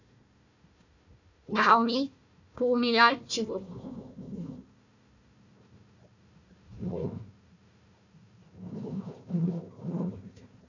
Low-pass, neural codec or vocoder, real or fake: 7.2 kHz; codec, 16 kHz, 1 kbps, FunCodec, trained on Chinese and English, 50 frames a second; fake